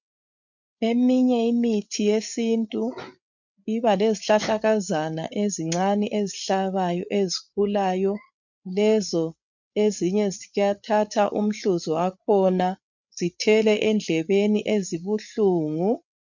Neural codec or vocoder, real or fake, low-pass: codec, 16 kHz, 8 kbps, FreqCodec, larger model; fake; 7.2 kHz